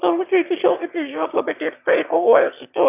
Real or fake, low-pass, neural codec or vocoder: fake; 3.6 kHz; autoencoder, 22.05 kHz, a latent of 192 numbers a frame, VITS, trained on one speaker